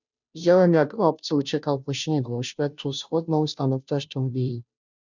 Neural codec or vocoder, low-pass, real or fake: codec, 16 kHz, 0.5 kbps, FunCodec, trained on Chinese and English, 25 frames a second; 7.2 kHz; fake